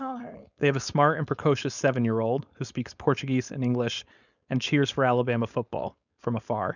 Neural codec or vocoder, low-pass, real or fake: codec, 16 kHz, 4.8 kbps, FACodec; 7.2 kHz; fake